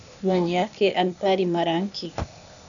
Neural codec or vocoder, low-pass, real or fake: codec, 16 kHz, 0.8 kbps, ZipCodec; 7.2 kHz; fake